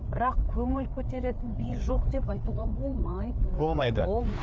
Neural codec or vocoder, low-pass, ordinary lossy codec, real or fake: codec, 16 kHz, 4 kbps, FreqCodec, larger model; none; none; fake